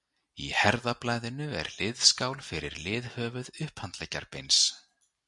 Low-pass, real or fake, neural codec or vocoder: 10.8 kHz; real; none